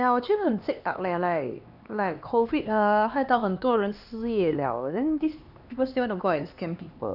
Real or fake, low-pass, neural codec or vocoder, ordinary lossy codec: fake; 5.4 kHz; codec, 16 kHz, 2 kbps, X-Codec, HuBERT features, trained on LibriSpeech; none